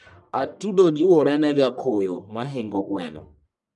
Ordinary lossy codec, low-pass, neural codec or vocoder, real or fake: none; 10.8 kHz; codec, 44.1 kHz, 1.7 kbps, Pupu-Codec; fake